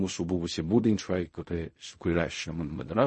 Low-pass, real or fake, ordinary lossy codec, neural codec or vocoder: 10.8 kHz; fake; MP3, 32 kbps; codec, 16 kHz in and 24 kHz out, 0.4 kbps, LongCat-Audio-Codec, fine tuned four codebook decoder